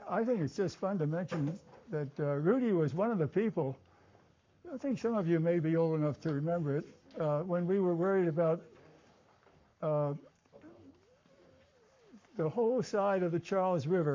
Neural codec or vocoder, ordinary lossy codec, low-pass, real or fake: codec, 44.1 kHz, 7.8 kbps, Pupu-Codec; MP3, 48 kbps; 7.2 kHz; fake